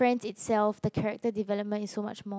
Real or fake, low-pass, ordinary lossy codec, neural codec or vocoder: real; none; none; none